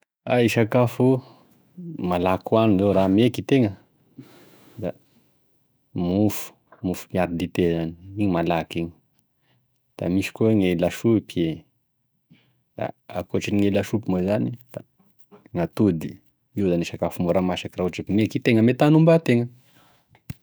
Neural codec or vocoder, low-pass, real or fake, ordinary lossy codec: autoencoder, 48 kHz, 128 numbers a frame, DAC-VAE, trained on Japanese speech; none; fake; none